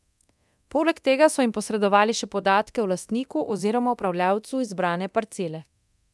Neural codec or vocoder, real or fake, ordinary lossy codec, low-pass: codec, 24 kHz, 0.9 kbps, DualCodec; fake; none; none